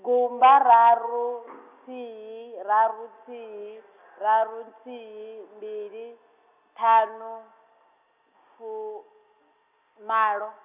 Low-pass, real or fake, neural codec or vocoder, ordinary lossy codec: 3.6 kHz; real; none; none